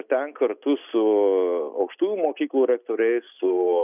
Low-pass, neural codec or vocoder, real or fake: 3.6 kHz; none; real